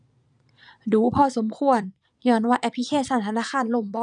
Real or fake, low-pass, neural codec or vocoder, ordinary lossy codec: fake; 9.9 kHz; vocoder, 22.05 kHz, 80 mel bands, Vocos; none